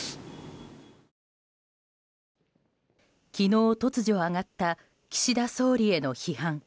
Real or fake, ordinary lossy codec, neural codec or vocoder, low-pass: real; none; none; none